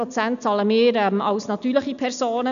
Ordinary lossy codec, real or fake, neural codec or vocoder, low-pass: none; real; none; 7.2 kHz